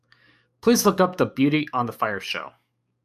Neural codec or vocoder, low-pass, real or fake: codec, 44.1 kHz, 7.8 kbps, DAC; 14.4 kHz; fake